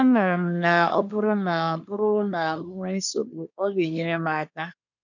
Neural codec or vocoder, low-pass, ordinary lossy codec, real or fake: codec, 24 kHz, 1 kbps, SNAC; 7.2 kHz; none; fake